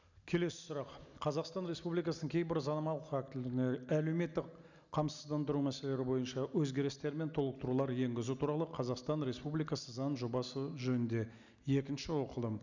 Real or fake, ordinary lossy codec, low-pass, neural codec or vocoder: real; none; 7.2 kHz; none